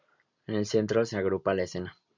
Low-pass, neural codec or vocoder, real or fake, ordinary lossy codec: 7.2 kHz; none; real; MP3, 64 kbps